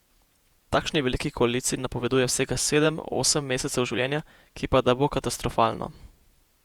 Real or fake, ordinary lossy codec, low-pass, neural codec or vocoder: fake; Opus, 64 kbps; 19.8 kHz; vocoder, 48 kHz, 128 mel bands, Vocos